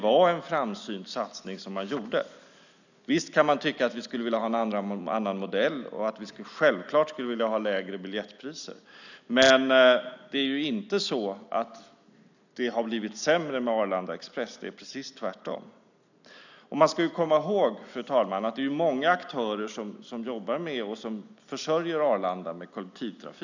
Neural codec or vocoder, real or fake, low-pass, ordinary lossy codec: none; real; 7.2 kHz; none